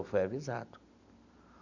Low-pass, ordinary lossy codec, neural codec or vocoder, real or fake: 7.2 kHz; none; none; real